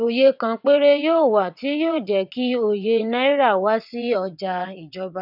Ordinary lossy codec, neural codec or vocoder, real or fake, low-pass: none; vocoder, 22.05 kHz, 80 mel bands, WaveNeXt; fake; 5.4 kHz